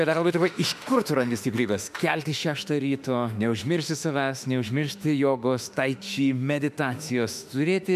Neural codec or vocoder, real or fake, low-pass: autoencoder, 48 kHz, 32 numbers a frame, DAC-VAE, trained on Japanese speech; fake; 14.4 kHz